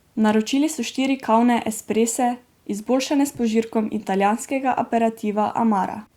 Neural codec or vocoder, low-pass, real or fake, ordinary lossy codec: none; 19.8 kHz; real; Opus, 64 kbps